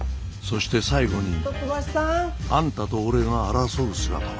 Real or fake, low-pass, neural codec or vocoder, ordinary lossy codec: real; none; none; none